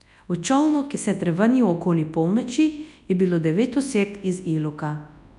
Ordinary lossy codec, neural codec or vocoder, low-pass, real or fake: none; codec, 24 kHz, 0.9 kbps, WavTokenizer, large speech release; 10.8 kHz; fake